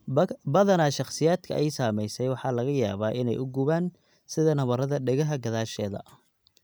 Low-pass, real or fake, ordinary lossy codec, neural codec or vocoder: none; real; none; none